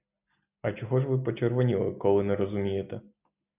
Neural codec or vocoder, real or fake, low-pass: none; real; 3.6 kHz